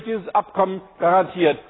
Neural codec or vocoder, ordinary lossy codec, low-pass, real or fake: none; AAC, 16 kbps; 7.2 kHz; real